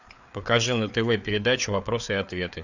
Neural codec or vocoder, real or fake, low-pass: codec, 16 kHz, 4 kbps, FreqCodec, larger model; fake; 7.2 kHz